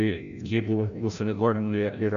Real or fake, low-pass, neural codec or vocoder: fake; 7.2 kHz; codec, 16 kHz, 0.5 kbps, FreqCodec, larger model